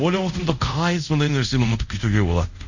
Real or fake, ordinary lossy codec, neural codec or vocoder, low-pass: fake; none; codec, 24 kHz, 0.5 kbps, DualCodec; 7.2 kHz